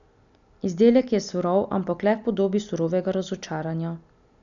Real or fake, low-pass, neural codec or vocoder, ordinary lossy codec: real; 7.2 kHz; none; none